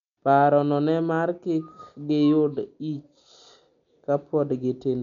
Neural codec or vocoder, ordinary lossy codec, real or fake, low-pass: none; MP3, 64 kbps; real; 7.2 kHz